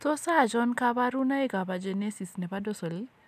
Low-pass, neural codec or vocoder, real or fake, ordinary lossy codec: 14.4 kHz; none; real; none